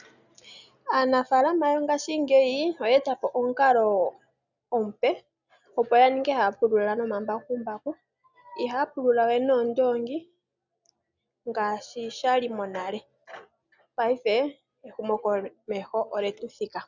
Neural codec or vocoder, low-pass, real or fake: none; 7.2 kHz; real